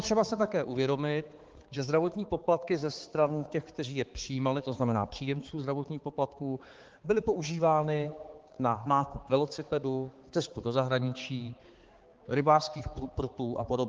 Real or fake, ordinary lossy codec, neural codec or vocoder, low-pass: fake; Opus, 16 kbps; codec, 16 kHz, 4 kbps, X-Codec, HuBERT features, trained on balanced general audio; 7.2 kHz